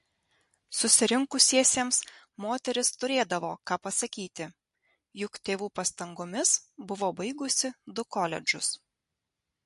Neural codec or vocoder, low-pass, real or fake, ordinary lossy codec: none; 14.4 kHz; real; MP3, 48 kbps